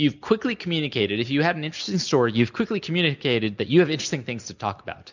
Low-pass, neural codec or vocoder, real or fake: 7.2 kHz; none; real